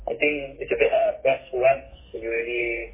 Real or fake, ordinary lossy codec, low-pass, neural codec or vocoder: fake; MP3, 16 kbps; 3.6 kHz; codec, 44.1 kHz, 2.6 kbps, SNAC